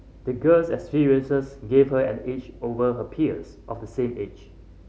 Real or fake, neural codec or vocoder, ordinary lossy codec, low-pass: real; none; none; none